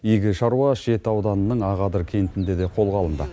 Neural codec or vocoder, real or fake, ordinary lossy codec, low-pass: none; real; none; none